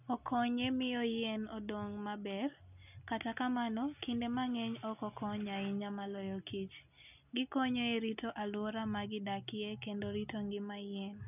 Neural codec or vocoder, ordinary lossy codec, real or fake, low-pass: none; none; real; 3.6 kHz